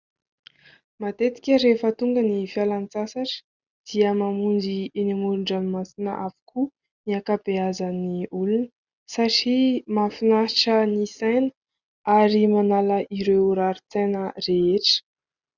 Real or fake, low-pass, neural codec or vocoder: real; 7.2 kHz; none